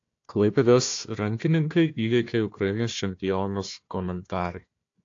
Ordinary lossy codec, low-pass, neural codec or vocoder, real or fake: AAC, 48 kbps; 7.2 kHz; codec, 16 kHz, 1 kbps, FunCodec, trained on Chinese and English, 50 frames a second; fake